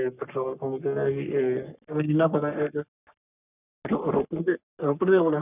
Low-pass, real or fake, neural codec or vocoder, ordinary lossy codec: 3.6 kHz; fake; codec, 44.1 kHz, 3.4 kbps, Pupu-Codec; none